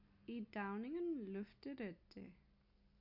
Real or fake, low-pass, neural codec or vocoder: real; 5.4 kHz; none